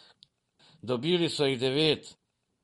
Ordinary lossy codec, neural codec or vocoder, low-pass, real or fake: MP3, 48 kbps; vocoder, 44.1 kHz, 128 mel bands every 512 samples, BigVGAN v2; 19.8 kHz; fake